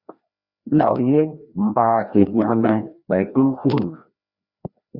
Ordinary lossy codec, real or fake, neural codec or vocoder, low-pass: Opus, 64 kbps; fake; codec, 16 kHz, 1 kbps, FreqCodec, larger model; 5.4 kHz